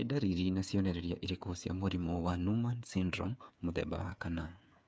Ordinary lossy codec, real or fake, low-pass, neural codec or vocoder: none; fake; none; codec, 16 kHz, 8 kbps, FreqCodec, smaller model